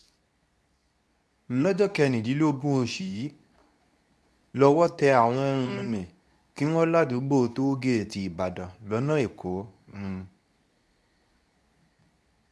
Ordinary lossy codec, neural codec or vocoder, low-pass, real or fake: none; codec, 24 kHz, 0.9 kbps, WavTokenizer, medium speech release version 2; none; fake